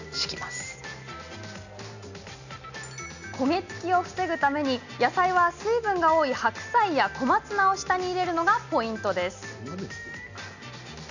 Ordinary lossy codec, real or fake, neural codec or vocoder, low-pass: none; real; none; 7.2 kHz